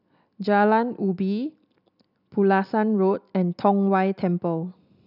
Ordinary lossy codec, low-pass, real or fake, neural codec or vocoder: none; 5.4 kHz; real; none